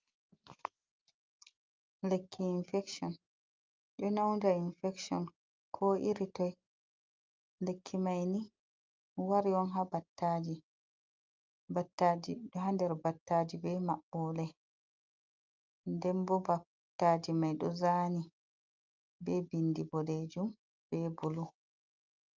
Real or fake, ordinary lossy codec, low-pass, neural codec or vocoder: real; Opus, 24 kbps; 7.2 kHz; none